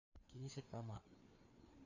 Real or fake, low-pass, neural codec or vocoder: fake; 7.2 kHz; codec, 16 kHz, 4 kbps, FreqCodec, larger model